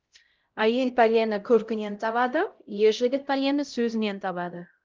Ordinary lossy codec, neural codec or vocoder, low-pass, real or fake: Opus, 32 kbps; codec, 16 kHz, 0.5 kbps, X-Codec, HuBERT features, trained on LibriSpeech; 7.2 kHz; fake